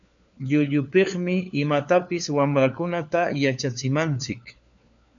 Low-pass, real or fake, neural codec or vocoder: 7.2 kHz; fake; codec, 16 kHz, 4 kbps, FunCodec, trained on LibriTTS, 50 frames a second